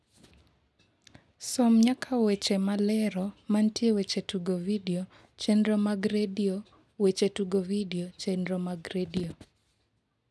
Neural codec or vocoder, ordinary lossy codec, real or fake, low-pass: none; none; real; none